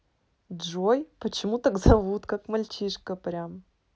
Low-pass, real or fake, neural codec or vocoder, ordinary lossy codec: none; real; none; none